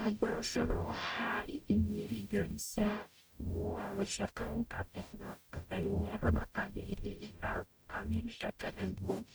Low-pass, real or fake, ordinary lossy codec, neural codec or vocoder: none; fake; none; codec, 44.1 kHz, 0.9 kbps, DAC